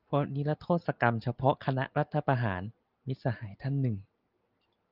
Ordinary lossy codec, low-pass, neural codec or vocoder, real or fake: Opus, 32 kbps; 5.4 kHz; none; real